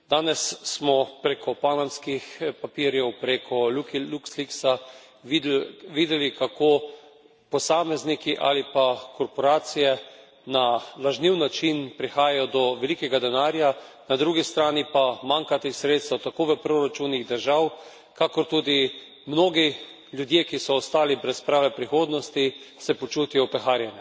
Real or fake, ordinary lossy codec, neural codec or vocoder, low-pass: real; none; none; none